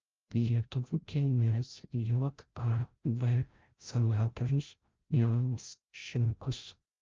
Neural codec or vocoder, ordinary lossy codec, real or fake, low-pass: codec, 16 kHz, 0.5 kbps, FreqCodec, larger model; Opus, 24 kbps; fake; 7.2 kHz